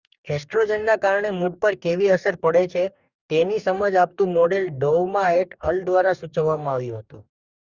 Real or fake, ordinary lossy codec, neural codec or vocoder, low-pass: fake; Opus, 64 kbps; codec, 44.1 kHz, 2.6 kbps, DAC; 7.2 kHz